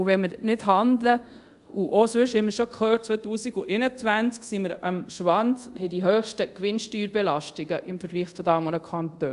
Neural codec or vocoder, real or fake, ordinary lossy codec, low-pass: codec, 24 kHz, 0.5 kbps, DualCodec; fake; AAC, 96 kbps; 10.8 kHz